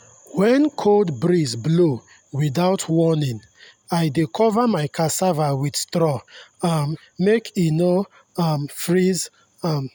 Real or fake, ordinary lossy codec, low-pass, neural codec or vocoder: real; none; none; none